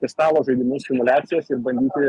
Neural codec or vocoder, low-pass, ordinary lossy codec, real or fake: none; 10.8 kHz; Opus, 64 kbps; real